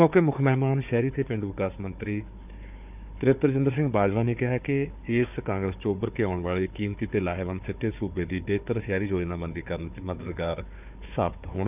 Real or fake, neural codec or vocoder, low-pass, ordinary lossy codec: fake; codec, 16 kHz, 4 kbps, FunCodec, trained on Chinese and English, 50 frames a second; 3.6 kHz; none